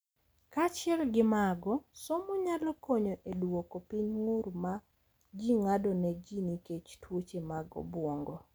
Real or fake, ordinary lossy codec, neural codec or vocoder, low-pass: real; none; none; none